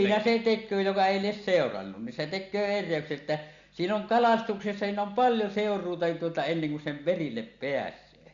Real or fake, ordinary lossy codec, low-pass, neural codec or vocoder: real; Opus, 64 kbps; 7.2 kHz; none